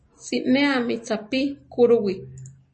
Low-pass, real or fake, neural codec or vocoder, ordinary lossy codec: 10.8 kHz; real; none; MP3, 32 kbps